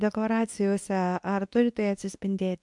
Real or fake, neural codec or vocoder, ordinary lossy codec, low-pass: fake; codec, 24 kHz, 0.9 kbps, WavTokenizer, medium speech release version 2; MP3, 64 kbps; 10.8 kHz